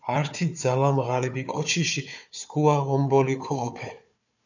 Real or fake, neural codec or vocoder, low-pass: fake; codec, 16 kHz, 4 kbps, FunCodec, trained on Chinese and English, 50 frames a second; 7.2 kHz